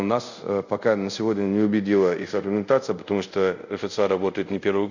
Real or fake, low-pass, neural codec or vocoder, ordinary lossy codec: fake; 7.2 kHz; codec, 24 kHz, 0.5 kbps, DualCodec; none